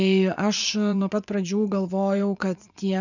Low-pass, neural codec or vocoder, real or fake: 7.2 kHz; vocoder, 24 kHz, 100 mel bands, Vocos; fake